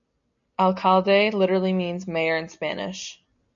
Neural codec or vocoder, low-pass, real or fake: none; 7.2 kHz; real